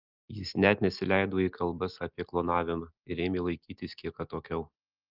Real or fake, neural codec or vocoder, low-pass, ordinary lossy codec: real; none; 5.4 kHz; Opus, 32 kbps